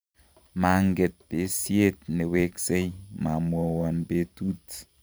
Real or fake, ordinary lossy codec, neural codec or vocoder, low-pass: fake; none; vocoder, 44.1 kHz, 128 mel bands every 512 samples, BigVGAN v2; none